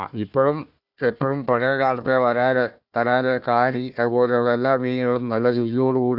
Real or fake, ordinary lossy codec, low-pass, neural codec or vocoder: fake; none; 5.4 kHz; codec, 16 kHz, 1 kbps, FunCodec, trained on Chinese and English, 50 frames a second